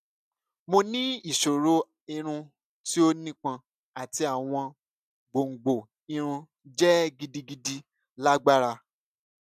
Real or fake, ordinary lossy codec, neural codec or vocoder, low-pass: real; none; none; 14.4 kHz